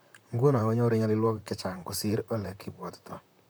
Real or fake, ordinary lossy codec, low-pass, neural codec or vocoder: fake; none; none; vocoder, 44.1 kHz, 128 mel bands, Pupu-Vocoder